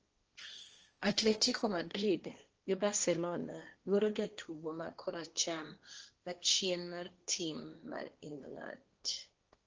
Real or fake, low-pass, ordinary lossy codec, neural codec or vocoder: fake; 7.2 kHz; Opus, 24 kbps; codec, 16 kHz, 1.1 kbps, Voila-Tokenizer